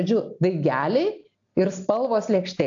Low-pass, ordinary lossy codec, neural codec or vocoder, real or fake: 7.2 kHz; AAC, 64 kbps; none; real